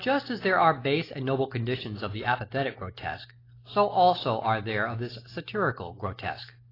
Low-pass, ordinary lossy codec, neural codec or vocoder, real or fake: 5.4 kHz; AAC, 24 kbps; none; real